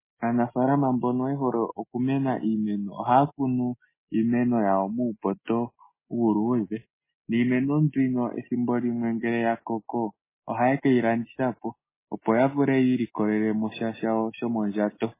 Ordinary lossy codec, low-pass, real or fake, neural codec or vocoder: MP3, 16 kbps; 3.6 kHz; real; none